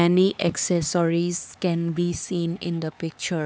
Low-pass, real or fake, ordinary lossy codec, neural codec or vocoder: none; fake; none; codec, 16 kHz, 4 kbps, X-Codec, HuBERT features, trained on LibriSpeech